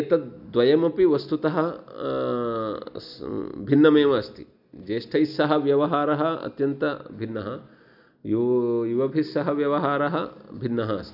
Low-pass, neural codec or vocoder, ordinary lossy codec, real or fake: 5.4 kHz; none; none; real